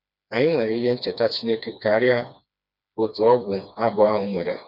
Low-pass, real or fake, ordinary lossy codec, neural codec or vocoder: 5.4 kHz; fake; none; codec, 16 kHz, 2 kbps, FreqCodec, smaller model